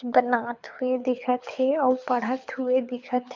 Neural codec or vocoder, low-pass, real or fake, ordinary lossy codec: codec, 24 kHz, 6 kbps, HILCodec; 7.2 kHz; fake; MP3, 64 kbps